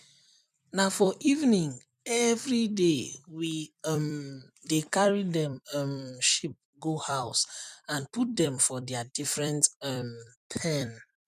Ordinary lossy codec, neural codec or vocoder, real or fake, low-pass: none; vocoder, 44.1 kHz, 128 mel bands every 256 samples, BigVGAN v2; fake; 14.4 kHz